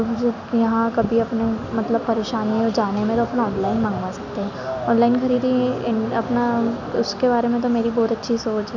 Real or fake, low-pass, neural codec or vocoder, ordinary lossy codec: real; 7.2 kHz; none; none